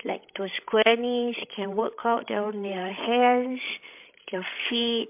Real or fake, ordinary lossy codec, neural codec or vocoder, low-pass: fake; MP3, 32 kbps; codec, 16 kHz, 16 kbps, FreqCodec, larger model; 3.6 kHz